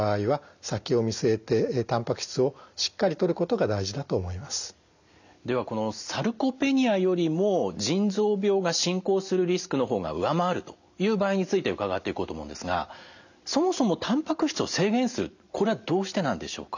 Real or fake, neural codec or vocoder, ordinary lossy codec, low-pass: real; none; none; 7.2 kHz